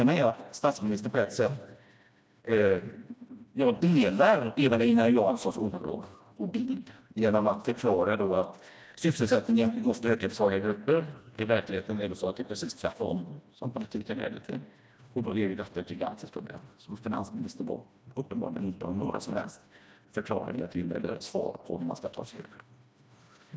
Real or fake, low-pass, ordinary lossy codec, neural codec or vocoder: fake; none; none; codec, 16 kHz, 1 kbps, FreqCodec, smaller model